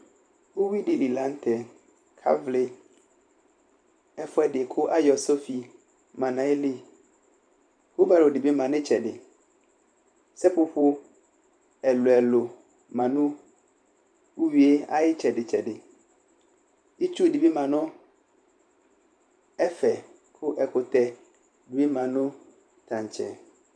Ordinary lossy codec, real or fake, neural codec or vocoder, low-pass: MP3, 96 kbps; fake; vocoder, 44.1 kHz, 128 mel bands every 512 samples, BigVGAN v2; 9.9 kHz